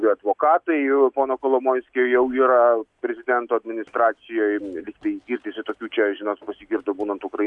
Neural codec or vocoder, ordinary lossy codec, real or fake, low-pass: none; MP3, 96 kbps; real; 10.8 kHz